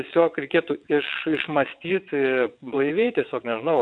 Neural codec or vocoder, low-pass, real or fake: vocoder, 22.05 kHz, 80 mel bands, WaveNeXt; 9.9 kHz; fake